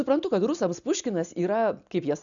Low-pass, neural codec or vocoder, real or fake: 7.2 kHz; none; real